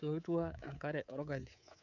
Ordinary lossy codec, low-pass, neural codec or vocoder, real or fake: none; 7.2 kHz; codec, 24 kHz, 3.1 kbps, DualCodec; fake